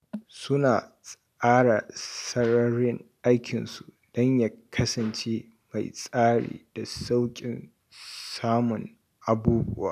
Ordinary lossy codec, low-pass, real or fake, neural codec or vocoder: none; 14.4 kHz; real; none